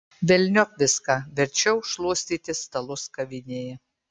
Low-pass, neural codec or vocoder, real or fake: 9.9 kHz; none; real